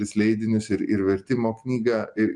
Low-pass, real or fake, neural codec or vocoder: 10.8 kHz; real; none